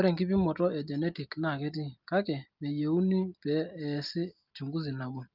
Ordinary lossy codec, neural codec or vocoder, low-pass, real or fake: Opus, 32 kbps; none; 5.4 kHz; real